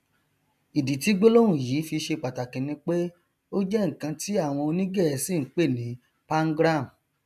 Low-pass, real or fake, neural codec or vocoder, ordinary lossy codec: 14.4 kHz; real; none; none